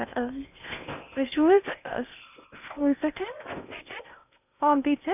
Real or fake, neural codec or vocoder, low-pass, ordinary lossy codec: fake; codec, 16 kHz in and 24 kHz out, 0.8 kbps, FocalCodec, streaming, 65536 codes; 3.6 kHz; none